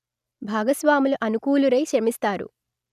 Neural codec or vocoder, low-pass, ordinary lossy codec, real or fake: none; 14.4 kHz; none; real